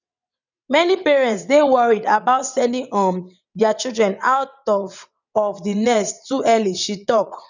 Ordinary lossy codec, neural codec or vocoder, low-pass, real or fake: none; vocoder, 22.05 kHz, 80 mel bands, WaveNeXt; 7.2 kHz; fake